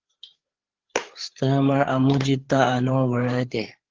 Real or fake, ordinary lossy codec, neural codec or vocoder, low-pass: fake; Opus, 16 kbps; codec, 16 kHz, 4 kbps, FreqCodec, larger model; 7.2 kHz